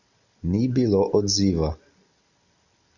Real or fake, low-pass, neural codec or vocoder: real; 7.2 kHz; none